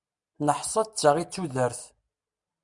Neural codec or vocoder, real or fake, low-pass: none; real; 10.8 kHz